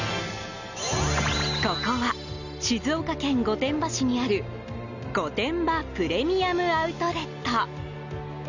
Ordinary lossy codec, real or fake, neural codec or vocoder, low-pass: none; real; none; 7.2 kHz